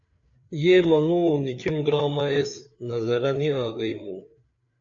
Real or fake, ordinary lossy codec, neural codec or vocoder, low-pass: fake; AAC, 64 kbps; codec, 16 kHz, 4 kbps, FreqCodec, larger model; 7.2 kHz